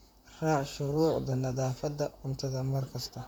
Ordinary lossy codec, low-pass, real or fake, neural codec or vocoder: none; none; fake; vocoder, 44.1 kHz, 128 mel bands, Pupu-Vocoder